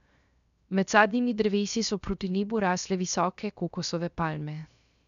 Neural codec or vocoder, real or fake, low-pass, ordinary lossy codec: codec, 16 kHz, 0.7 kbps, FocalCodec; fake; 7.2 kHz; none